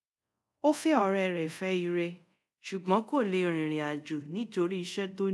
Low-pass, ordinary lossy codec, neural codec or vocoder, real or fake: none; none; codec, 24 kHz, 0.5 kbps, DualCodec; fake